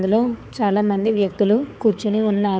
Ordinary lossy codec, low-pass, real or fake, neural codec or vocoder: none; none; fake; codec, 16 kHz, 4 kbps, X-Codec, HuBERT features, trained on balanced general audio